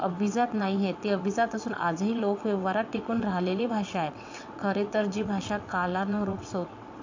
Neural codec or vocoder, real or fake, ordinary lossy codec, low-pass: vocoder, 22.05 kHz, 80 mel bands, WaveNeXt; fake; AAC, 48 kbps; 7.2 kHz